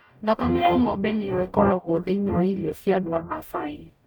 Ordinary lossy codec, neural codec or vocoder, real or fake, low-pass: none; codec, 44.1 kHz, 0.9 kbps, DAC; fake; 19.8 kHz